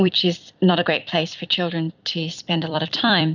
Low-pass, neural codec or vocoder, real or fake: 7.2 kHz; vocoder, 44.1 kHz, 128 mel bands every 256 samples, BigVGAN v2; fake